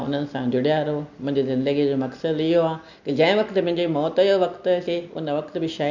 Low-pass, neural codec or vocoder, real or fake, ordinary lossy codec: 7.2 kHz; none; real; none